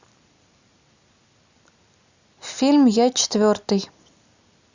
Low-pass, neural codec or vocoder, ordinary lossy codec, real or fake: 7.2 kHz; none; Opus, 64 kbps; real